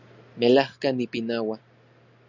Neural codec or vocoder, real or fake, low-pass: none; real; 7.2 kHz